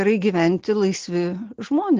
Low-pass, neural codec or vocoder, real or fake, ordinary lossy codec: 7.2 kHz; none; real; Opus, 16 kbps